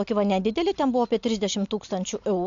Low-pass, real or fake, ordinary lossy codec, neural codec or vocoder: 7.2 kHz; real; AAC, 64 kbps; none